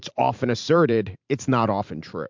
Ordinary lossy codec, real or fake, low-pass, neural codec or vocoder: MP3, 64 kbps; fake; 7.2 kHz; vocoder, 44.1 kHz, 128 mel bands every 512 samples, BigVGAN v2